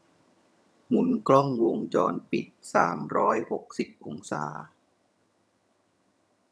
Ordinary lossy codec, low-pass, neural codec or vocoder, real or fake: none; none; vocoder, 22.05 kHz, 80 mel bands, HiFi-GAN; fake